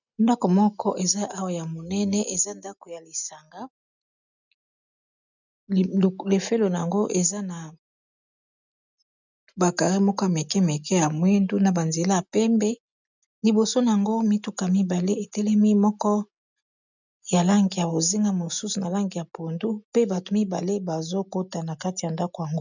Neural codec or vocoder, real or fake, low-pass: none; real; 7.2 kHz